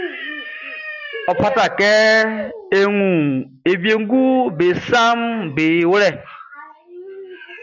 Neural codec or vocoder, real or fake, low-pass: none; real; 7.2 kHz